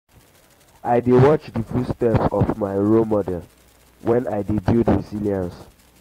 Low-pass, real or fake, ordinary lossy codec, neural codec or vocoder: 19.8 kHz; fake; AAC, 48 kbps; vocoder, 44.1 kHz, 128 mel bands every 256 samples, BigVGAN v2